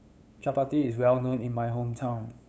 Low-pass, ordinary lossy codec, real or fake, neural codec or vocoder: none; none; fake; codec, 16 kHz, 8 kbps, FunCodec, trained on LibriTTS, 25 frames a second